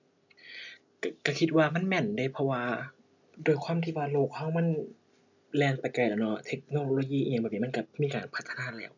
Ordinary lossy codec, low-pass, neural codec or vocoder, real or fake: none; 7.2 kHz; none; real